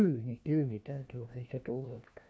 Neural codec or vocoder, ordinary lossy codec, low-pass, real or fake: codec, 16 kHz, 1 kbps, FunCodec, trained on LibriTTS, 50 frames a second; none; none; fake